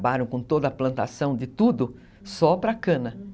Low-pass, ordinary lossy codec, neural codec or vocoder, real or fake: none; none; none; real